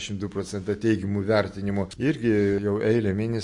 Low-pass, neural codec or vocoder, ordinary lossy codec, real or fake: 10.8 kHz; none; MP3, 48 kbps; real